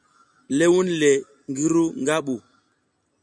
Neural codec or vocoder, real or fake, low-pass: none; real; 9.9 kHz